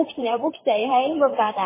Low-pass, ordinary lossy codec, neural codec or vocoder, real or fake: 3.6 kHz; MP3, 16 kbps; vocoder, 44.1 kHz, 128 mel bands, Pupu-Vocoder; fake